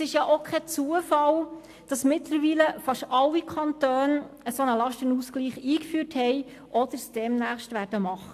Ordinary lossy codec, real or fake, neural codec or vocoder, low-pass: AAC, 64 kbps; real; none; 14.4 kHz